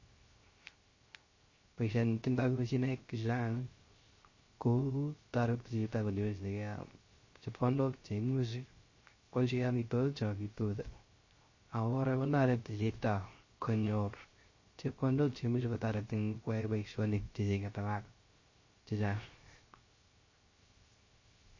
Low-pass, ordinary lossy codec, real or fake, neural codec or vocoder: 7.2 kHz; MP3, 32 kbps; fake; codec, 16 kHz, 0.3 kbps, FocalCodec